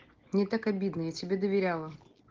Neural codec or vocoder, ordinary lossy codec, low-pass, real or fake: none; Opus, 16 kbps; 7.2 kHz; real